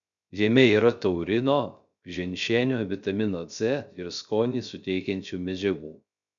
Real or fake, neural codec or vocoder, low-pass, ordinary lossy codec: fake; codec, 16 kHz, 0.3 kbps, FocalCodec; 7.2 kHz; MP3, 96 kbps